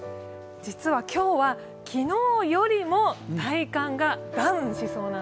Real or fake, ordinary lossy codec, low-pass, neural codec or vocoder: real; none; none; none